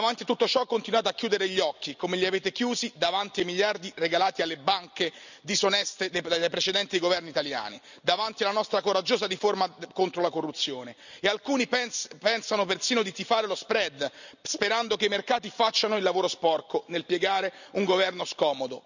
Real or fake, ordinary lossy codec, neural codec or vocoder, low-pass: real; none; none; 7.2 kHz